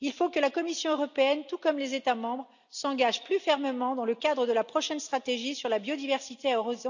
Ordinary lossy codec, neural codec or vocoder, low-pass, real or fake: none; none; 7.2 kHz; real